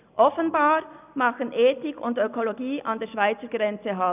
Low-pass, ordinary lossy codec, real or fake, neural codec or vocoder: 3.6 kHz; none; real; none